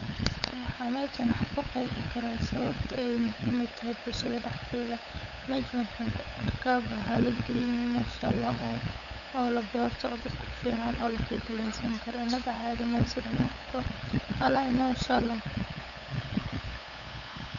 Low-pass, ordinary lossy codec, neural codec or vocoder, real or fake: 7.2 kHz; none; codec, 16 kHz, 8 kbps, FunCodec, trained on LibriTTS, 25 frames a second; fake